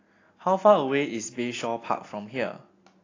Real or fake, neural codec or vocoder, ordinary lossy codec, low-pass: real; none; AAC, 32 kbps; 7.2 kHz